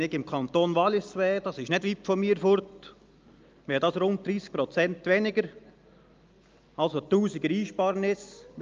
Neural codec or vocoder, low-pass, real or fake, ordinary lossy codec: none; 7.2 kHz; real; Opus, 24 kbps